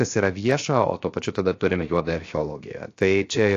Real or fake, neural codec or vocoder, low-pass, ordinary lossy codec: fake; codec, 16 kHz, 0.7 kbps, FocalCodec; 7.2 kHz; AAC, 48 kbps